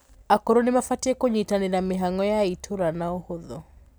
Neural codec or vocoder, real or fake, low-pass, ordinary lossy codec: none; real; none; none